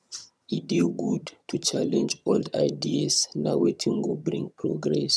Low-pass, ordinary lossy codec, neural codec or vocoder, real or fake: none; none; vocoder, 22.05 kHz, 80 mel bands, HiFi-GAN; fake